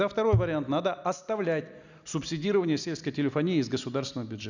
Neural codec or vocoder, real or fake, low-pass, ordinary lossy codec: none; real; 7.2 kHz; none